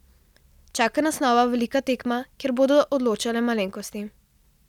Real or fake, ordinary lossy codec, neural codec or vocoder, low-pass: real; none; none; 19.8 kHz